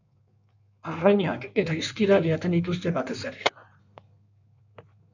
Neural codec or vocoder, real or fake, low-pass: codec, 16 kHz in and 24 kHz out, 1.1 kbps, FireRedTTS-2 codec; fake; 7.2 kHz